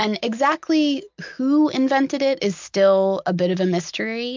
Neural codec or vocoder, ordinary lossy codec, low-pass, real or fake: none; MP3, 64 kbps; 7.2 kHz; real